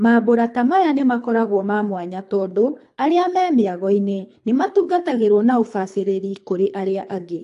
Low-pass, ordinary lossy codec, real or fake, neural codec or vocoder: 10.8 kHz; AAC, 64 kbps; fake; codec, 24 kHz, 3 kbps, HILCodec